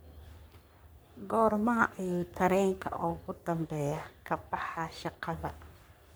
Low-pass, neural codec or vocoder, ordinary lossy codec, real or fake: none; codec, 44.1 kHz, 3.4 kbps, Pupu-Codec; none; fake